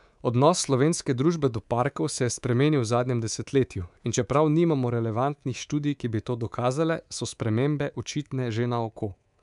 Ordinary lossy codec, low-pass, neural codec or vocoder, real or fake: MP3, 96 kbps; 10.8 kHz; codec, 24 kHz, 3.1 kbps, DualCodec; fake